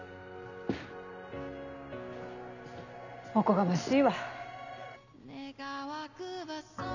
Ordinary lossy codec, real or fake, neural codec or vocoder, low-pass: none; real; none; 7.2 kHz